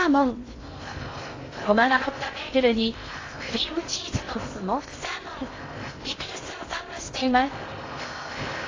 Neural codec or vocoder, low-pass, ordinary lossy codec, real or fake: codec, 16 kHz in and 24 kHz out, 0.6 kbps, FocalCodec, streaming, 2048 codes; 7.2 kHz; AAC, 32 kbps; fake